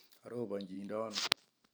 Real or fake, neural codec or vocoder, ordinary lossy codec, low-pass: fake; vocoder, 44.1 kHz, 128 mel bands every 512 samples, BigVGAN v2; none; none